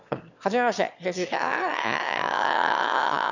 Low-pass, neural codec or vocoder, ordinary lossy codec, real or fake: 7.2 kHz; autoencoder, 22.05 kHz, a latent of 192 numbers a frame, VITS, trained on one speaker; none; fake